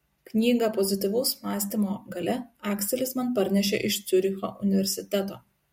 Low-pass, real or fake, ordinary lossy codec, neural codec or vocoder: 19.8 kHz; real; MP3, 64 kbps; none